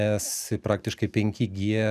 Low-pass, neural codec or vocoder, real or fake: 14.4 kHz; none; real